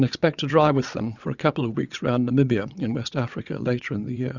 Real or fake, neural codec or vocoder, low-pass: fake; vocoder, 22.05 kHz, 80 mel bands, WaveNeXt; 7.2 kHz